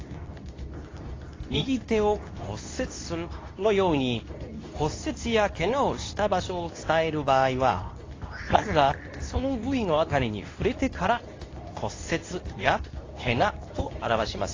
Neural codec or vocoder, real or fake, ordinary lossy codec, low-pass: codec, 24 kHz, 0.9 kbps, WavTokenizer, medium speech release version 2; fake; AAC, 32 kbps; 7.2 kHz